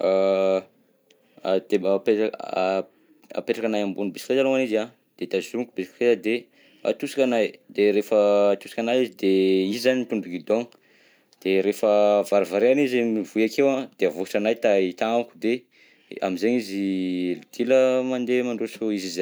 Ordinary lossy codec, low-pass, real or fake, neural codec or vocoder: none; none; real; none